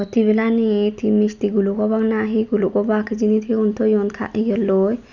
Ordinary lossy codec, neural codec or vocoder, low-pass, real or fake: none; none; 7.2 kHz; real